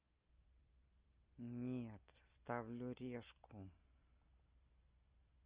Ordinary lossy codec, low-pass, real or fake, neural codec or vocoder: Opus, 24 kbps; 3.6 kHz; real; none